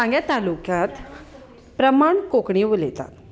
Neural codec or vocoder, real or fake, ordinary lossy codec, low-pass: none; real; none; none